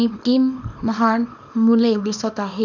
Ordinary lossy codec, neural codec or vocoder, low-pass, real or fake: none; codec, 24 kHz, 0.9 kbps, WavTokenizer, small release; 7.2 kHz; fake